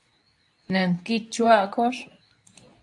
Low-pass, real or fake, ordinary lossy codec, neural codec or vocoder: 10.8 kHz; fake; AAC, 64 kbps; codec, 24 kHz, 0.9 kbps, WavTokenizer, medium speech release version 2